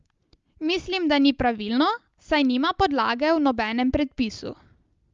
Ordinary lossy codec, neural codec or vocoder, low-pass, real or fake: Opus, 32 kbps; none; 7.2 kHz; real